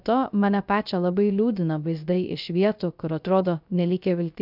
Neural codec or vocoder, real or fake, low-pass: codec, 16 kHz, 0.3 kbps, FocalCodec; fake; 5.4 kHz